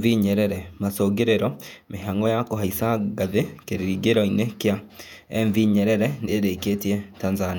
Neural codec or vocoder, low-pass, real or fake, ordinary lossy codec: vocoder, 48 kHz, 128 mel bands, Vocos; 19.8 kHz; fake; none